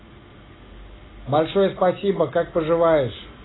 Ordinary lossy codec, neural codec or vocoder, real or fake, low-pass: AAC, 16 kbps; none; real; 7.2 kHz